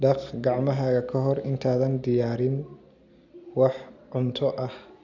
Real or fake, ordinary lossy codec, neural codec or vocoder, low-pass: real; none; none; 7.2 kHz